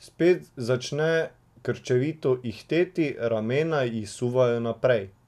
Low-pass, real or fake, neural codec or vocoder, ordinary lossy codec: 14.4 kHz; real; none; none